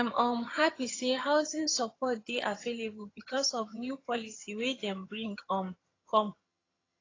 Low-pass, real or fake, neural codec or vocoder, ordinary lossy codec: 7.2 kHz; fake; vocoder, 22.05 kHz, 80 mel bands, HiFi-GAN; AAC, 32 kbps